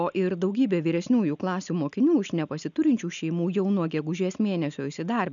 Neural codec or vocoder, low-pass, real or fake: none; 7.2 kHz; real